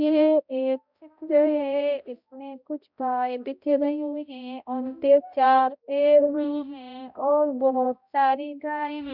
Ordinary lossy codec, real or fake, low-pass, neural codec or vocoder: none; fake; 5.4 kHz; codec, 16 kHz, 0.5 kbps, X-Codec, HuBERT features, trained on balanced general audio